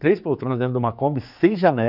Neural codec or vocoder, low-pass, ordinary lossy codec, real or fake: codec, 16 kHz, 4 kbps, X-Codec, HuBERT features, trained on general audio; 5.4 kHz; none; fake